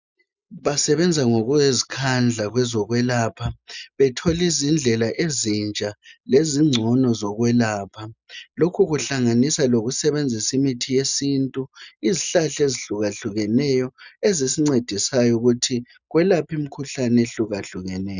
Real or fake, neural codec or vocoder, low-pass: real; none; 7.2 kHz